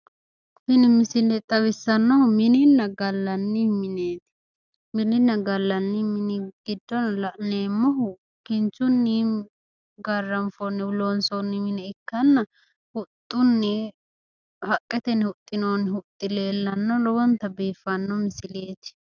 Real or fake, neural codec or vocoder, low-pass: real; none; 7.2 kHz